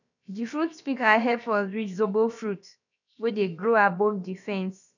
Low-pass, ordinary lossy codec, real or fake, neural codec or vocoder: 7.2 kHz; none; fake; codec, 16 kHz, about 1 kbps, DyCAST, with the encoder's durations